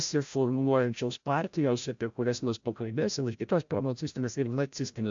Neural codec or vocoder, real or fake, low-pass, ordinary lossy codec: codec, 16 kHz, 0.5 kbps, FreqCodec, larger model; fake; 7.2 kHz; AAC, 64 kbps